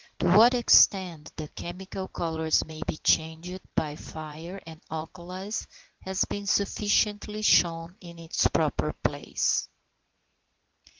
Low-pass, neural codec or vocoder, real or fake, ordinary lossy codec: 7.2 kHz; vocoder, 44.1 kHz, 80 mel bands, Vocos; fake; Opus, 16 kbps